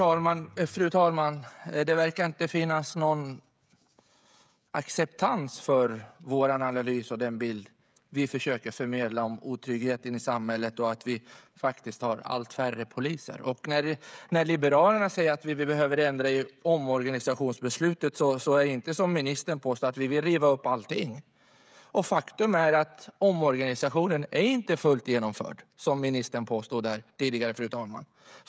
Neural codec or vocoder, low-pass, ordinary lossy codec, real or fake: codec, 16 kHz, 16 kbps, FreqCodec, smaller model; none; none; fake